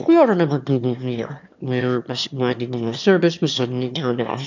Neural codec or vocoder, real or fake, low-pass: autoencoder, 22.05 kHz, a latent of 192 numbers a frame, VITS, trained on one speaker; fake; 7.2 kHz